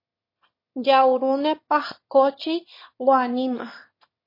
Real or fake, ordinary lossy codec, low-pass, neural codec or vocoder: fake; MP3, 24 kbps; 5.4 kHz; autoencoder, 22.05 kHz, a latent of 192 numbers a frame, VITS, trained on one speaker